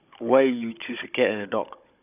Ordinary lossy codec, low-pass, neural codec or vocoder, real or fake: none; 3.6 kHz; codec, 16 kHz, 16 kbps, FunCodec, trained on Chinese and English, 50 frames a second; fake